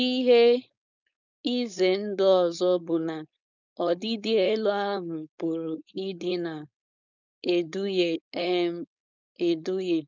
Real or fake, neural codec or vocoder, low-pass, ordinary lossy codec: fake; codec, 16 kHz, 4.8 kbps, FACodec; 7.2 kHz; none